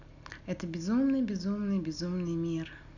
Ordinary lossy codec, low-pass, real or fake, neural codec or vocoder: none; 7.2 kHz; real; none